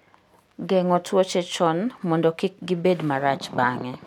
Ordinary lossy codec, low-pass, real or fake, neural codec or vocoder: none; 19.8 kHz; real; none